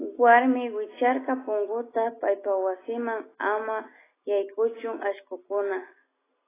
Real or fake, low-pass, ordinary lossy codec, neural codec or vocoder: real; 3.6 kHz; AAC, 16 kbps; none